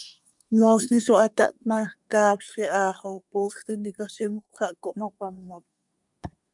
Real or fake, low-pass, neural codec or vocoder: fake; 10.8 kHz; codec, 24 kHz, 1 kbps, SNAC